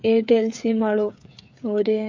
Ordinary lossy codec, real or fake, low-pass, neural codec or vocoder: MP3, 48 kbps; fake; 7.2 kHz; codec, 16 kHz, 8 kbps, FreqCodec, smaller model